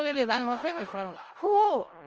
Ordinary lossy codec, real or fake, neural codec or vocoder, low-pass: Opus, 24 kbps; fake; codec, 16 kHz in and 24 kHz out, 0.4 kbps, LongCat-Audio-Codec, four codebook decoder; 7.2 kHz